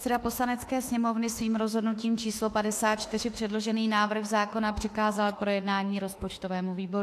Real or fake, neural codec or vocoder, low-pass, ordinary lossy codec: fake; autoencoder, 48 kHz, 32 numbers a frame, DAC-VAE, trained on Japanese speech; 14.4 kHz; AAC, 64 kbps